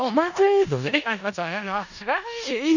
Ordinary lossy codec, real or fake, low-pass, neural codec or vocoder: none; fake; 7.2 kHz; codec, 16 kHz in and 24 kHz out, 0.4 kbps, LongCat-Audio-Codec, four codebook decoder